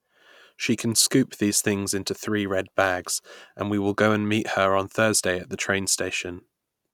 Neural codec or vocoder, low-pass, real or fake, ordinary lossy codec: none; 19.8 kHz; real; none